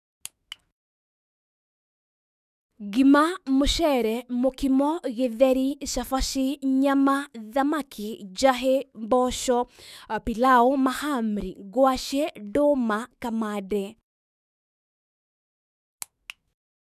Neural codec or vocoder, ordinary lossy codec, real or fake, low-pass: autoencoder, 48 kHz, 128 numbers a frame, DAC-VAE, trained on Japanese speech; none; fake; 14.4 kHz